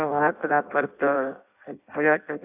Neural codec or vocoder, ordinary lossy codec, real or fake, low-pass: codec, 16 kHz in and 24 kHz out, 0.6 kbps, FireRedTTS-2 codec; none; fake; 3.6 kHz